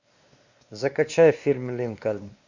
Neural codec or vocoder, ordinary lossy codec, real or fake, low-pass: codec, 16 kHz, 2 kbps, X-Codec, WavLM features, trained on Multilingual LibriSpeech; Opus, 64 kbps; fake; 7.2 kHz